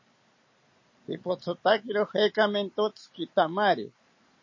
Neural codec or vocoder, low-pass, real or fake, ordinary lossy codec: none; 7.2 kHz; real; MP3, 32 kbps